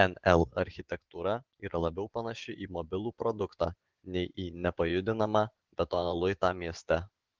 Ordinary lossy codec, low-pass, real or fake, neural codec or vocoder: Opus, 16 kbps; 7.2 kHz; fake; vocoder, 24 kHz, 100 mel bands, Vocos